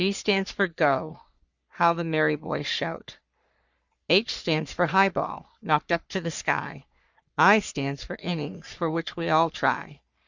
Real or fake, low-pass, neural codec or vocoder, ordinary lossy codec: fake; 7.2 kHz; codec, 44.1 kHz, 3.4 kbps, Pupu-Codec; Opus, 64 kbps